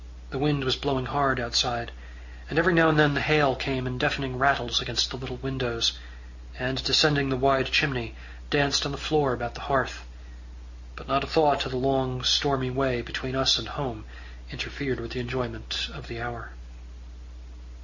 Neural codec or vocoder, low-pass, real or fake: none; 7.2 kHz; real